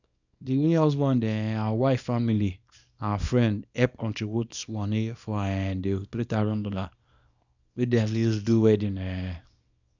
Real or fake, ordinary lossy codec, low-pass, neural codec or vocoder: fake; none; 7.2 kHz; codec, 24 kHz, 0.9 kbps, WavTokenizer, small release